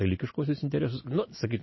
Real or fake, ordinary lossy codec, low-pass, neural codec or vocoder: real; MP3, 24 kbps; 7.2 kHz; none